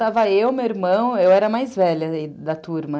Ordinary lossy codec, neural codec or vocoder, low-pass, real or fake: none; none; none; real